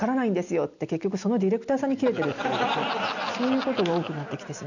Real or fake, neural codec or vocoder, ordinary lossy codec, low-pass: real; none; none; 7.2 kHz